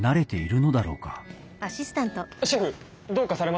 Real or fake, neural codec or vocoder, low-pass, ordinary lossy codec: real; none; none; none